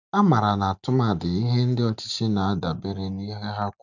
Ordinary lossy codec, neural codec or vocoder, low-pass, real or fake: none; none; 7.2 kHz; real